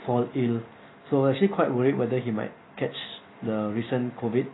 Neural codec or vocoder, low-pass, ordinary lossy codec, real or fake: none; 7.2 kHz; AAC, 16 kbps; real